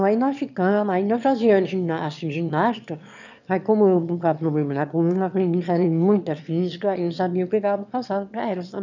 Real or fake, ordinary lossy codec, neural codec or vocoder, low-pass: fake; none; autoencoder, 22.05 kHz, a latent of 192 numbers a frame, VITS, trained on one speaker; 7.2 kHz